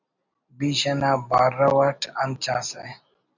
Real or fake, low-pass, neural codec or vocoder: real; 7.2 kHz; none